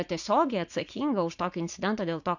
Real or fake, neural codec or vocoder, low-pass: fake; vocoder, 44.1 kHz, 80 mel bands, Vocos; 7.2 kHz